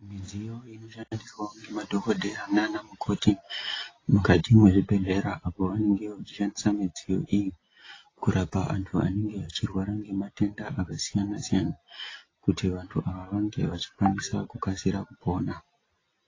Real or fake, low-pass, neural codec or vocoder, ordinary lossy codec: real; 7.2 kHz; none; AAC, 32 kbps